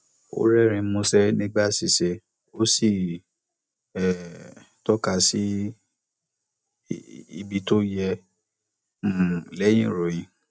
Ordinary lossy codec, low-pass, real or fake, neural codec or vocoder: none; none; real; none